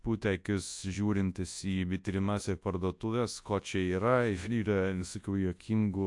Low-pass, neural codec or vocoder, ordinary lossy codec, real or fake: 10.8 kHz; codec, 24 kHz, 0.9 kbps, WavTokenizer, large speech release; AAC, 64 kbps; fake